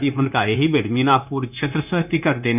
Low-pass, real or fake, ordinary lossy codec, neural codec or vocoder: 3.6 kHz; fake; none; codec, 16 kHz, 0.9 kbps, LongCat-Audio-Codec